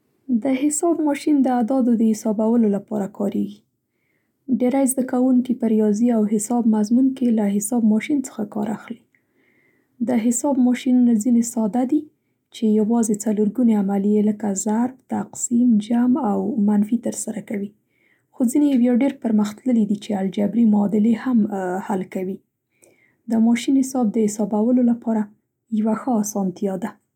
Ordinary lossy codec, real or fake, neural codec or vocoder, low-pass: none; real; none; 19.8 kHz